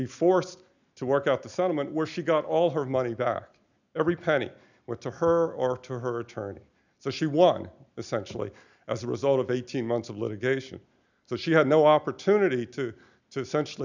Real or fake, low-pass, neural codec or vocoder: real; 7.2 kHz; none